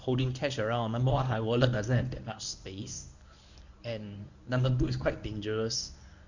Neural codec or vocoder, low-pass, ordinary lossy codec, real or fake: codec, 24 kHz, 0.9 kbps, WavTokenizer, medium speech release version 1; 7.2 kHz; none; fake